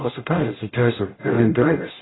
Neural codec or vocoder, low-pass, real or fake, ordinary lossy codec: codec, 44.1 kHz, 0.9 kbps, DAC; 7.2 kHz; fake; AAC, 16 kbps